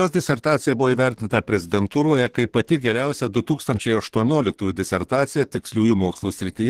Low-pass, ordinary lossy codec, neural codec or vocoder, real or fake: 14.4 kHz; Opus, 16 kbps; codec, 32 kHz, 1.9 kbps, SNAC; fake